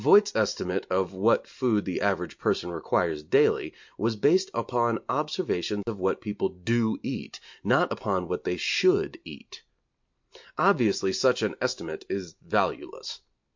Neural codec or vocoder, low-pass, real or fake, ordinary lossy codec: none; 7.2 kHz; real; MP3, 48 kbps